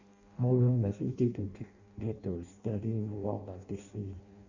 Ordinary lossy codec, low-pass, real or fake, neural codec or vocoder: Opus, 64 kbps; 7.2 kHz; fake; codec, 16 kHz in and 24 kHz out, 0.6 kbps, FireRedTTS-2 codec